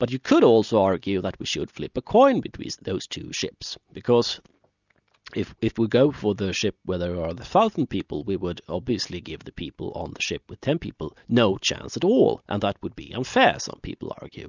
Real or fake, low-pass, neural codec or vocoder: real; 7.2 kHz; none